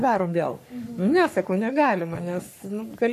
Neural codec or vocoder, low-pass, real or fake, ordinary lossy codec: codec, 44.1 kHz, 3.4 kbps, Pupu-Codec; 14.4 kHz; fake; AAC, 96 kbps